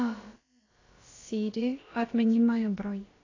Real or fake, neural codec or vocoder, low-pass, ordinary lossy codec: fake; codec, 16 kHz, about 1 kbps, DyCAST, with the encoder's durations; 7.2 kHz; AAC, 32 kbps